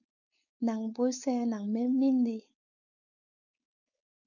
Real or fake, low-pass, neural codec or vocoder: fake; 7.2 kHz; codec, 16 kHz, 4.8 kbps, FACodec